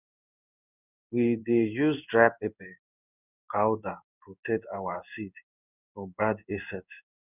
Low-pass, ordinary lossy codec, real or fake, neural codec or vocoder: 3.6 kHz; none; fake; codec, 16 kHz in and 24 kHz out, 1 kbps, XY-Tokenizer